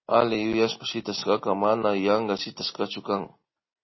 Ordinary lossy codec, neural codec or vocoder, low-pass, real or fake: MP3, 24 kbps; none; 7.2 kHz; real